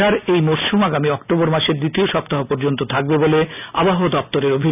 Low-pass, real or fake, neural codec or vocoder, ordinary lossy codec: 3.6 kHz; real; none; none